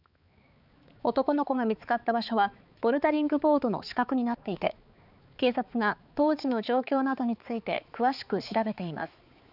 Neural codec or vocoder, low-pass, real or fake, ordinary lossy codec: codec, 16 kHz, 4 kbps, X-Codec, HuBERT features, trained on balanced general audio; 5.4 kHz; fake; none